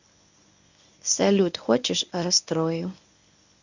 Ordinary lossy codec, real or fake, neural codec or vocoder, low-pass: none; fake; codec, 24 kHz, 0.9 kbps, WavTokenizer, medium speech release version 1; 7.2 kHz